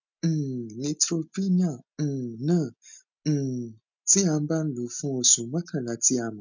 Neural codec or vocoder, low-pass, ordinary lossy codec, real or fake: none; 7.2 kHz; none; real